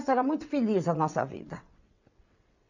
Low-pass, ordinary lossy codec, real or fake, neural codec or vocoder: 7.2 kHz; none; fake; vocoder, 22.05 kHz, 80 mel bands, WaveNeXt